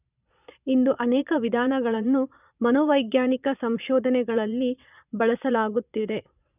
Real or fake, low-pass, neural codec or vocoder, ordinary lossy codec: fake; 3.6 kHz; vocoder, 22.05 kHz, 80 mel bands, Vocos; none